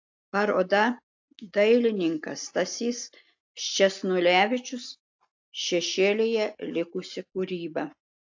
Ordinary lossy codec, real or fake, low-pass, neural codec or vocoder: AAC, 48 kbps; real; 7.2 kHz; none